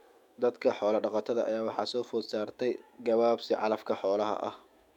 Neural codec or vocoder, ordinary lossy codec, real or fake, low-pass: autoencoder, 48 kHz, 128 numbers a frame, DAC-VAE, trained on Japanese speech; MP3, 96 kbps; fake; 19.8 kHz